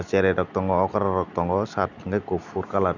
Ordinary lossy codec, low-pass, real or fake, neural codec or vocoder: none; 7.2 kHz; real; none